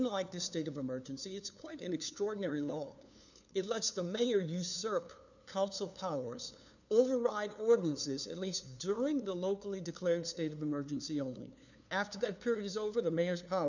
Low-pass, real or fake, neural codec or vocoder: 7.2 kHz; fake; codec, 16 kHz, 2 kbps, FunCodec, trained on LibriTTS, 25 frames a second